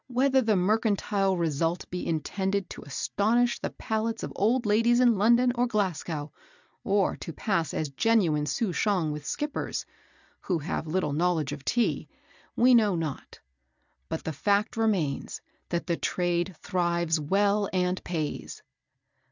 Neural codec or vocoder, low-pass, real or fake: none; 7.2 kHz; real